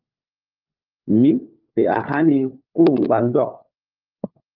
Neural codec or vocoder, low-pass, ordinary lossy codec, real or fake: codec, 16 kHz, 4 kbps, FunCodec, trained on LibriTTS, 50 frames a second; 5.4 kHz; Opus, 24 kbps; fake